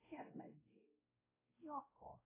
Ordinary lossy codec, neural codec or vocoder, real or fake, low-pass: AAC, 16 kbps; codec, 16 kHz, 0.5 kbps, X-Codec, WavLM features, trained on Multilingual LibriSpeech; fake; 3.6 kHz